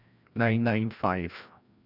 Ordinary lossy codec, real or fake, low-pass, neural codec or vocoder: none; fake; 5.4 kHz; codec, 16 kHz, 1 kbps, FreqCodec, larger model